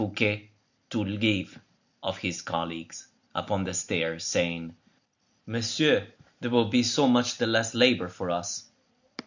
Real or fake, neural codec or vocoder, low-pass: real; none; 7.2 kHz